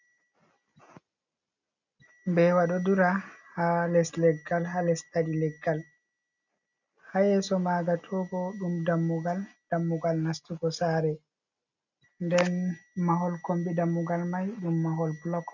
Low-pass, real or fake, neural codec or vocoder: 7.2 kHz; real; none